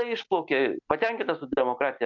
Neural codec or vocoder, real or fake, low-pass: none; real; 7.2 kHz